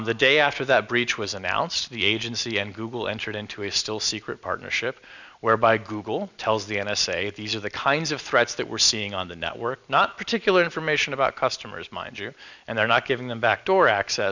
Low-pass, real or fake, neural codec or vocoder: 7.2 kHz; real; none